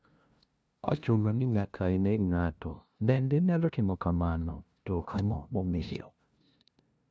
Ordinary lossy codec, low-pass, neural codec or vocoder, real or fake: none; none; codec, 16 kHz, 0.5 kbps, FunCodec, trained on LibriTTS, 25 frames a second; fake